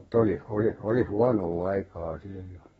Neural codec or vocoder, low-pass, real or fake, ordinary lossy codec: codec, 32 kHz, 1.9 kbps, SNAC; 14.4 kHz; fake; AAC, 24 kbps